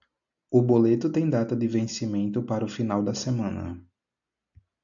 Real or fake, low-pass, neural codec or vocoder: real; 7.2 kHz; none